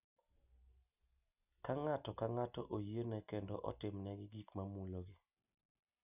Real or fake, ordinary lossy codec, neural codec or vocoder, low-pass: real; none; none; 3.6 kHz